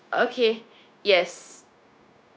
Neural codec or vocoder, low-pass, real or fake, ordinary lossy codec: codec, 16 kHz, 0.9 kbps, LongCat-Audio-Codec; none; fake; none